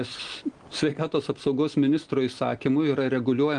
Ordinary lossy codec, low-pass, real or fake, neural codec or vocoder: Opus, 24 kbps; 9.9 kHz; real; none